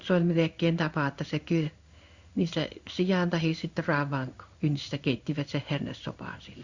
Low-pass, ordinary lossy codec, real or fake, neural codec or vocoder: 7.2 kHz; Opus, 64 kbps; fake; codec, 16 kHz in and 24 kHz out, 1 kbps, XY-Tokenizer